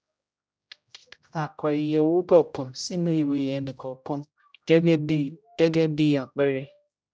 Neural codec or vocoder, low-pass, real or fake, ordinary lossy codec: codec, 16 kHz, 0.5 kbps, X-Codec, HuBERT features, trained on general audio; none; fake; none